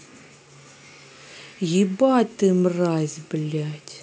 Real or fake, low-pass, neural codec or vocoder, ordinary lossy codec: real; none; none; none